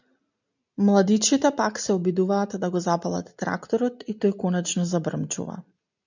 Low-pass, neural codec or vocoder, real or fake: 7.2 kHz; none; real